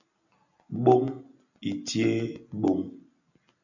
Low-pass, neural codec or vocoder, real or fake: 7.2 kHz; none; real